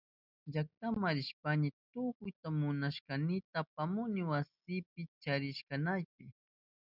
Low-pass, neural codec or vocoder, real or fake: 5.4 kHz; none; real